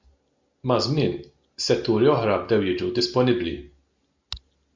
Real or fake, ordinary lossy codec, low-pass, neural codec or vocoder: real; MP3, 64 kbps; 7.2 kHz; none